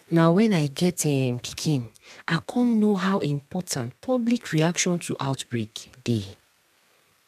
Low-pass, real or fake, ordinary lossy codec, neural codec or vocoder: 14.4 kHz; fake; none; codec, 32 kHz, 1.9 kbps, SNAC